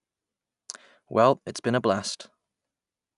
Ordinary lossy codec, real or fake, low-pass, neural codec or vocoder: none; real; 10.8 kHz; none